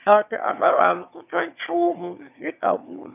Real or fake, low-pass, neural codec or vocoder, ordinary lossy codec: fake; 3.6 kHz; autoencoder, 22.05 kHz, a latent of 192 numbers a frame, VITS, trained on one speaker; none